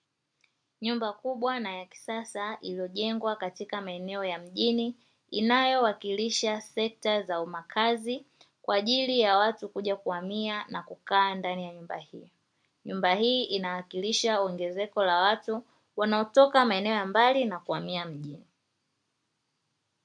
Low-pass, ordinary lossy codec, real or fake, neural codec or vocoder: 9.9 kHz; MP3, 64 kbps; real; none